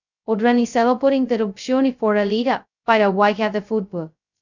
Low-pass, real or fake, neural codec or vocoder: 7.2 kHz; fake; codec, 16 kHz, 0.2 kbps, FocalCodec